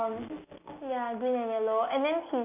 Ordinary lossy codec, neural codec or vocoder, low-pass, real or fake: none; none; 3.6 kHz; real